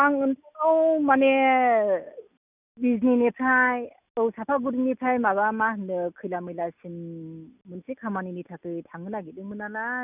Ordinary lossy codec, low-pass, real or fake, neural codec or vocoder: none; 3.6 kHz; real; none